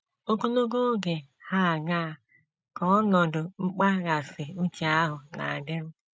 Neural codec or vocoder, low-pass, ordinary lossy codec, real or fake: none; none; none; real